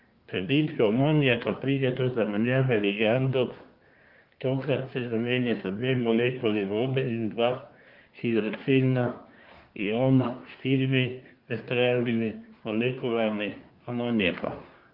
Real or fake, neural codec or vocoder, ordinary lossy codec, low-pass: fake; codec, 24 kHz, 1 kbps, SNAC; Opus, 32 kbps; 5.4 kHz